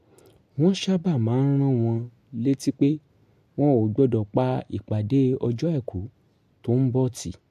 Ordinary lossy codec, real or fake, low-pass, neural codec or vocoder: MP3, 64 kbps; real; 14.4 kHz; none